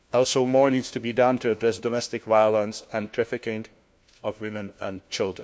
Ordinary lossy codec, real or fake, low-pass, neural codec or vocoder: none; fake; none; codec, 16 kHz, 1 kbps, FunCodec, trained on LibriTTS, 50 frames a second